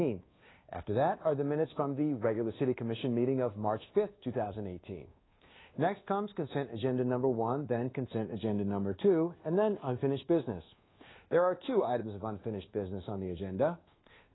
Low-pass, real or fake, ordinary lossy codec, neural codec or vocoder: 7.2 kHz; fake; AAC, 16 kbps; codec, 24 kHz, 1.2 kbps, DualCodec